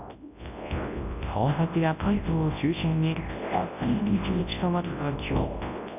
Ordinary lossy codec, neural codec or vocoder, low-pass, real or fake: none; codec, 24 kHz, 0.9 kbps, WavTokenizer, large speech release; 3.6 kHz; fake